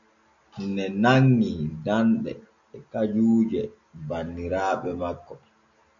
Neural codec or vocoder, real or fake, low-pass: none; real; 7.2 kHz